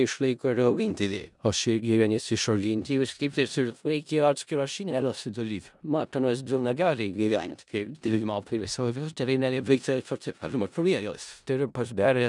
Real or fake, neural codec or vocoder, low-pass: fake; codec, 16 kHz in and 24 kHz out, 0.4 kbps, LongCat-Audio-Codec, four codebook decoder; 10.8 kHz